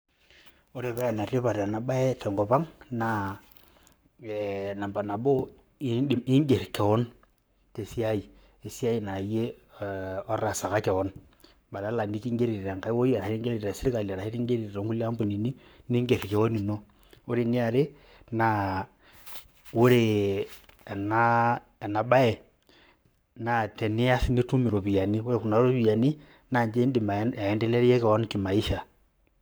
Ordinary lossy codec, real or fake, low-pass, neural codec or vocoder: none; fake; none; codec, 44.1 kHz, 7.8 kbps, Pupu-Codec